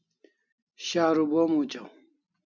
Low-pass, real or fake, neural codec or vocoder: 7.2 kHz; real; none